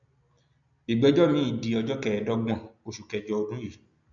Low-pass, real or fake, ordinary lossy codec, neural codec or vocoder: 7.2 kHz; real; none; none